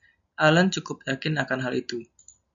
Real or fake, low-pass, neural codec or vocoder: real; 7.2 kHz; none